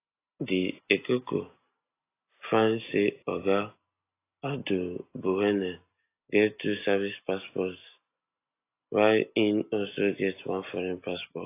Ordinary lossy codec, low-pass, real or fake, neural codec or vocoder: AAC, 24 kbps; 3.6 kHz; real; none